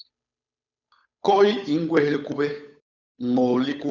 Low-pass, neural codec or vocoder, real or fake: 7.2 kHz; codec, 16 kHz, 8 kbps, FunCodec, trained on Chinese and English, 25 frames a second; fake